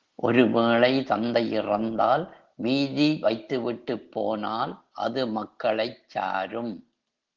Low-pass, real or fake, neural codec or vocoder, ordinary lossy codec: 7.2 kHz; real; none; Opus, 16 kbps